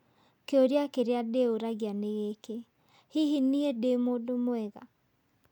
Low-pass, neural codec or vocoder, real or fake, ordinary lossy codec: 19.8 kHz; none; real; none